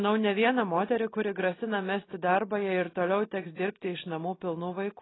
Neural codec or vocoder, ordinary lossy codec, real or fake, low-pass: none; AAC, 16 kbps; real; 7.2 kHz